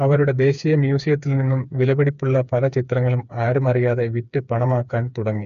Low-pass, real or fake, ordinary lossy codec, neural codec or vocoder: 7.2 kHz; fake; none; codec, 16 kHz, 4 kbps, FreqCodec, smaller model